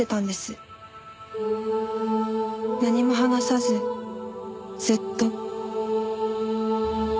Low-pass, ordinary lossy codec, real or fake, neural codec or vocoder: none; none; real; none